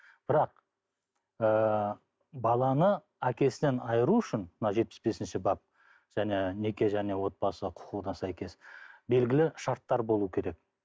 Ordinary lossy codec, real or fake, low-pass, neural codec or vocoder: none; real; none; none